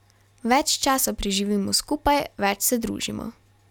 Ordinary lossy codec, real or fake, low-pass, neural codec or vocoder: none; real; 19.8 kHz; none